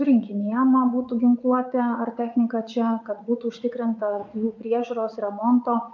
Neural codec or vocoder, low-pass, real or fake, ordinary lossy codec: vocoder, 44.1 kHz, 80 mel bands, Vocos; 7.2 kHz; fake; MP3, 64 kbps